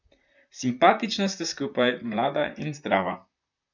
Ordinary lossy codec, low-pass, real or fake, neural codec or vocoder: none; 7.2 kHz; fake; vocoder, 44.1 kHz, 128 mel bands, Pupu-Vocoder